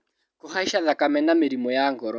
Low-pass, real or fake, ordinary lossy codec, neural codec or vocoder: none; real; none; none